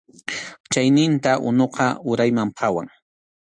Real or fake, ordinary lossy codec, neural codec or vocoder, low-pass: real; AAC, 64 kbps; none; 9.9 kHz